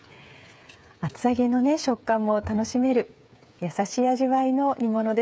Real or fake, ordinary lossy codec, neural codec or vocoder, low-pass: fake; none; codec, 16 kHz, 8 kbps, FreqCodec, smaller model; none